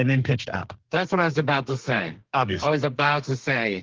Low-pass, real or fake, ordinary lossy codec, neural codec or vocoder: 7.2 kHz; fake; Opus, 16 kbps; codec, 32 kHz, 1.9 kbps, SNAC